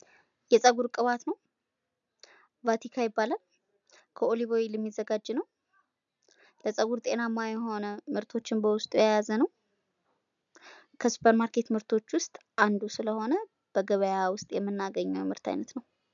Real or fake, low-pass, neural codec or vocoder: real; 7.2 kHz; none